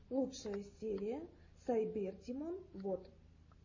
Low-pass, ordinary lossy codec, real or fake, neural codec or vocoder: 7.2 kHz; MP3, 32 kbps; real; none